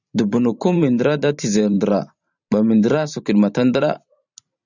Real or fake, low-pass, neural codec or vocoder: fake; 7.2 kHz; vocoder, 44.1 kHz, 128 mel bands every 512 samples, BigVGAN v2